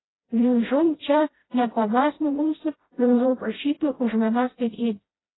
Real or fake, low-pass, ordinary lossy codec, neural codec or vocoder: fake; 7.2 kHz; AAC, 16 kbps; codec, 16 kHz, 0.5 kbps, FreqCodec, smaller model